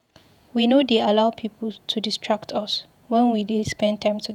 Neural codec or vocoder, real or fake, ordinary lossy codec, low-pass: vocoder, 48 kHz, 128 mel bands, Vocos; fake; none; 19.8 kHz